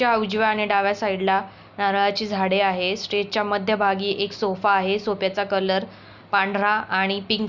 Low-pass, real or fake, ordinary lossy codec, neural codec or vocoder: 7.2 kHz; real; none; none